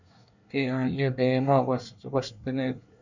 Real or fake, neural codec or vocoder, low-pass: fake; codec, 24 kHz, 1 kbps, SNAC; 7.2 kHz